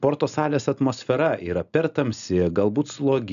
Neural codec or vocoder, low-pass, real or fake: none; 7.2 kHz; real